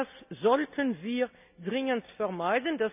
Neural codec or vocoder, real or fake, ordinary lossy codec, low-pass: none; real; none; 3.6 kHz